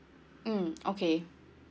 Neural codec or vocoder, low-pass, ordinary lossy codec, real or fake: none; none; none; real